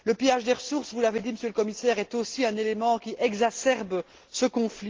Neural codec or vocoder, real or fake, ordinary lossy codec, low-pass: none; real; Opus, 16 kbps; 7.2 kHz